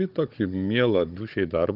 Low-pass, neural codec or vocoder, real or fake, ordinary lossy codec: 5.4 kHz; codec, 16 kHz, 16 kbps, FunCodec, trained on Chinese and English, 50 frames a second; fake; Opus, 24 kbps